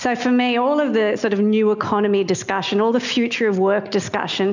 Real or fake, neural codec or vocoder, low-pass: real; none; 7.2 kHz